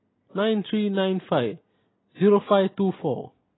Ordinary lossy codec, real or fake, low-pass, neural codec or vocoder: AAC, 16 kbps; real; 7.2 kHz; none